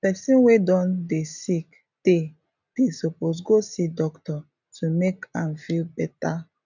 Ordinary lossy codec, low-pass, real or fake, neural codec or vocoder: none; 7.2 kHz; real; none